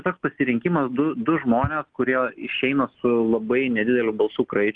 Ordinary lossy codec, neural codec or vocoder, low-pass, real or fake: Opus, 32 kbps; none; 9.9 kHz; real